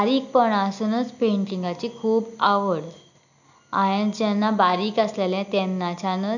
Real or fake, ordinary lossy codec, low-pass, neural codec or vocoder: real; none; 7.2 kHz; none